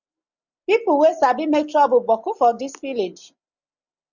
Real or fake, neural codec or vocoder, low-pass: real; none; 7.2 kHz